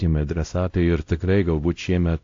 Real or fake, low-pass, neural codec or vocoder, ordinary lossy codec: fake; 7.2 kHz; codec, 16 kHz, 0.5 kbps, X-Codec, WavLM features, trained on Multilingual LibriSpeech; AAC, 48 kbps